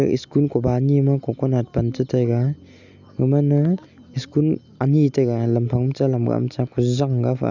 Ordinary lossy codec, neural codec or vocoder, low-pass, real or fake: none; none; 7.2 kHz; real